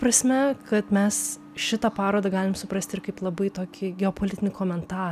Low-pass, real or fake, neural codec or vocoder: 14.4 kHz; real; none